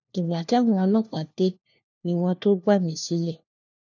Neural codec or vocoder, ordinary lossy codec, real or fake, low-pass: codec, 16 kHz, 1 kbps, FunCodec, trained on LibriTTS, 50 frames a second; none; fake; 7.2 kHz